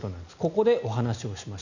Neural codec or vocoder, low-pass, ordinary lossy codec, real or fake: none; 7.2 kHz; none; real